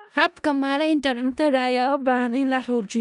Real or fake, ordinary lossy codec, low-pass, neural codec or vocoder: fake; none; 10.8 kHz; codec, 16 kHz in and 24 kHz out, 0.4 kbps, LongCat-Audio-Codec, four codebook decoder